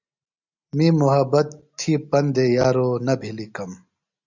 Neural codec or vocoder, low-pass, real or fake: none; 7.2 kHz; real